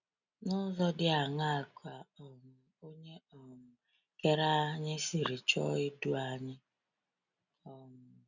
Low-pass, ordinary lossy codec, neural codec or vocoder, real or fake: 7.2 kHz; none; none; real